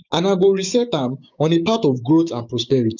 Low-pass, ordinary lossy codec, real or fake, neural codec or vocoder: 7.2 kHz; none; fake; codec, 16 kHz, 6 kbps, DAC